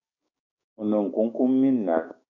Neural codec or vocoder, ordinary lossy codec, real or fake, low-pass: codec, 16 kHz, 6 kbps, DAC; AAC, 48 kbps; fake; 7.2 kHz